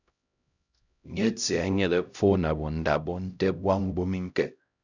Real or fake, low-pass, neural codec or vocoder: fake; 7.2 kHz; codec, 16 kHz, 0.5 kbps, X-Codec, HuBERT features, trained on LibriSpeech